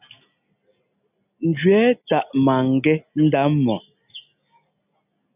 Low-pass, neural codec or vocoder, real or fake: 3.6 kHz; none; real